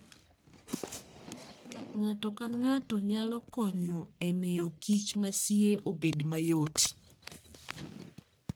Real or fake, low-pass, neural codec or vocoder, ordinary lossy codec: fake; none; codec, 44.1 kHz, 1.7 kbps, Pupu-Codec; none